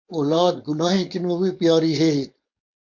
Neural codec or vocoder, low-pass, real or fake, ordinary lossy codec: codec, 16 kHz, 4.8 kbps, FACodec; 7.2 kHz; fake; MP3, 48 kbps